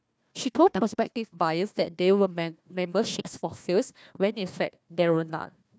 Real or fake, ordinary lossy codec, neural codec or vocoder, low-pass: fake; none; codec, 16 kHz, 1 kbps, FunCodec, trained on Chinese and English, 50 frames a second; none